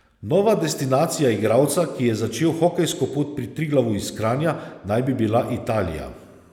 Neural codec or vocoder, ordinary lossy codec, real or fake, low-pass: none; none; real; 19.8 kHz